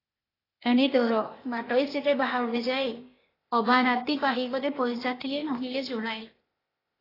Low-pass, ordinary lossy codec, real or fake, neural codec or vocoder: 5.4 kHz; AAC, 24 kbps; fake; codec, 16 kHz, 0.8 kbps, ZipCodec